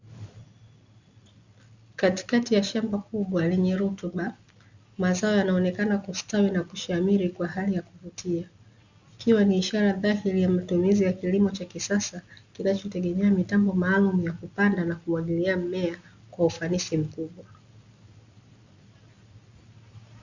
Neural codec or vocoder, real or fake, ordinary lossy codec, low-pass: none; real; Opus, 64 kbps; 7.2 kHz